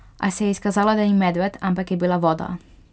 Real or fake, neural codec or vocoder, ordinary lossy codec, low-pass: real; none; none; none